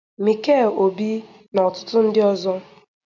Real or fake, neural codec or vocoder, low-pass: real; none; 7.2 kHz